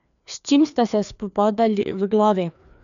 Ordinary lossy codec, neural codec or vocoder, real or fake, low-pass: none; codec, 16 kHz, 2 kbps, FreqCodec, larger model; fake; 7.2 kHz